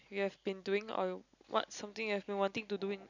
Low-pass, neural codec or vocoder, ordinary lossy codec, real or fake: 7.2 kHz; none; none; real